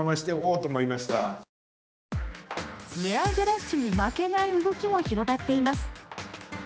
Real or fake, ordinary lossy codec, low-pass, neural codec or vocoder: fake; none; none; codec, 16 kHz, 2 kbps, X-Codec, HuBERT features, trained on general audio